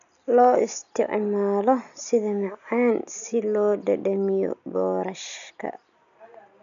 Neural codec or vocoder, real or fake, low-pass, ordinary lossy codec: none; real; 7.2 kHz; none